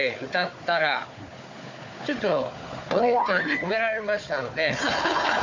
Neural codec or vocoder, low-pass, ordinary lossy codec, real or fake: codec, 16 kHz, 4 kbps, FunCodec, trained on Chinese and English, 50 frames a second; 7.2 kHz; MP3, 48 kbps; fake